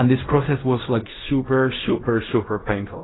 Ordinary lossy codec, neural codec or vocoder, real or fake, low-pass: AAC, 16 kbps; codec, 16 kHz in and 24 kHz out, 0.4 kbps, LongCat-Audio-Codec, fine tuned four codebook decoder; fake; 7.2 kHz